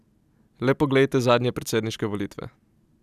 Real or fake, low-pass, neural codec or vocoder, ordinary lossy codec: real; 14.4 kHz; none; none